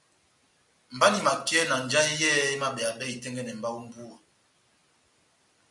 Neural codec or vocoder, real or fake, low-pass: none; real; 10.8 kHz